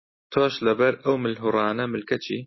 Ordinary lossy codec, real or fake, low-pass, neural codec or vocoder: MP3, 24 kbps; real; 7.2 kHz; none